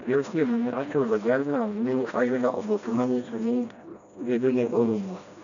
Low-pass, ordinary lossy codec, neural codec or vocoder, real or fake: 7.2 kHz; none; codec, 16 kHz, 1 kbps, FreqCodec, smaller model; fake